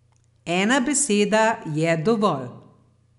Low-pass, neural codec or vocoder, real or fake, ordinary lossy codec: 10.8 kHz; none; real; none